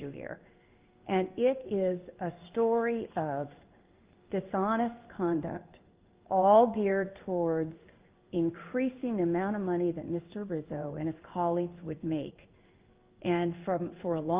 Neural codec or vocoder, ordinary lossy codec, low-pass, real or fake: codec, 16 kHz in and 24 kHz out, 1 kbps, XY-Tokenizer; Opus, 24 kbps; 3.6 kHz; fake